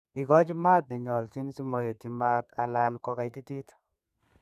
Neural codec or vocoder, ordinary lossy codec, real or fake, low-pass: codec, 32 kHz, 1.9 kbps, SNAC; none; fake; 14.4 kHz